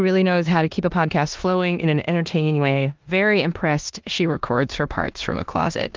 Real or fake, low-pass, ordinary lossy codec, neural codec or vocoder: fake; 7.2 kHz; Opus, 32 kbps; codec, 16 kHz in and 24 kHz out, 0.9 kbps, LongCat-Audio-Codec, four codebook decoder